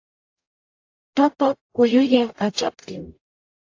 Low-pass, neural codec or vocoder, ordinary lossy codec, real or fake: 7.2 kHz; codec, 44.1 kHz, 0.9 kbps, DAC; AAC, 48 kbps; fake